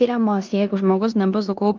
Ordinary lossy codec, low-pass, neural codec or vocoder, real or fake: Opus, 32 kbps; 7.2 kHz; codec, 16 kHz in and 24 kHz out, 0.9 kbps, LongCat-Audio-Codec, four codebook decoder; fake